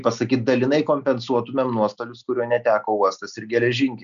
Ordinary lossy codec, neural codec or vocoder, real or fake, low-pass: AAC, 96 kbps; none; real; 7.2 kHz